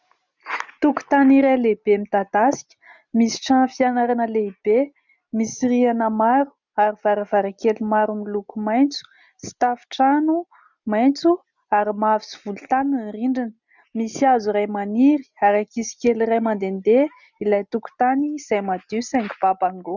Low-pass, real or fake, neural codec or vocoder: 7.2 kHz; real; none